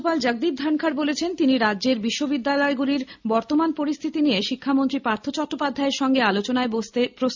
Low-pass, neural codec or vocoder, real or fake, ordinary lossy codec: 7.2 kHz; none; real; MP3, 64 kbps